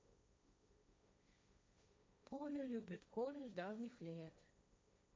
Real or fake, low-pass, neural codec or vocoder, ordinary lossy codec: fake; none; codec, 16 kHz, 1.1 kbps, Voila-Tokenizer; none